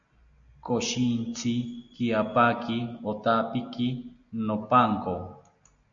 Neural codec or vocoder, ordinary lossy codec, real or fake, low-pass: none; AAC, 64 kbps; real; 7.2 kHz